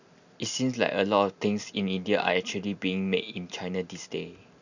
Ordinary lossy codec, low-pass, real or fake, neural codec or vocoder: none; 7.2 kHz; real; none